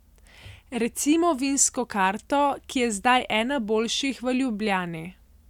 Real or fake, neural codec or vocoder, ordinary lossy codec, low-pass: real; none; none; 19.8 kHz